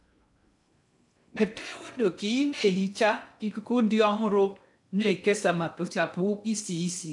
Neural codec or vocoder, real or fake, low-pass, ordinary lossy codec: codec, 16 kHz in and 24 kHz out, 0.6 kbps, FocalCodec, streaming, 2048 codes; fake; 10.8 kHz; none